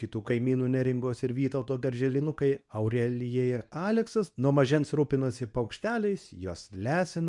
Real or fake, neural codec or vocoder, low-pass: fake; codec, 24 kHz, 0.9 kbps, WavTokenizer, medium speech release version 2; 10.8 kHz